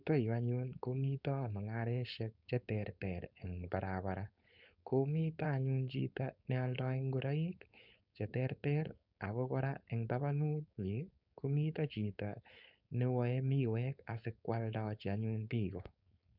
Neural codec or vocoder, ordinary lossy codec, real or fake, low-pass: codec, 16 kHz, 4.8 kbps, FACodec; none; fake; 5.4 kHz